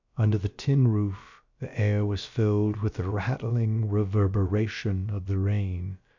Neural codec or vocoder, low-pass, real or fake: codec, 16 kHz, about 1 kbps, DyCAST, with the encoder's durations; 7.2 kHz; fake